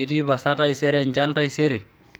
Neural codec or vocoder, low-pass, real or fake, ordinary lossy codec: codec, 44.1 kHz, 2.6 kbps, SNAC; none; fake; none